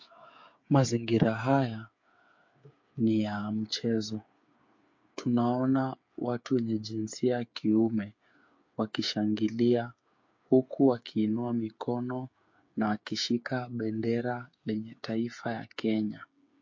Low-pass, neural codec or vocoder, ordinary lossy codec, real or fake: 7.2 kHz; codec, 16 kHz, 8 kbps, FreqCodec, smaller model; MP3, 48 kbps; fake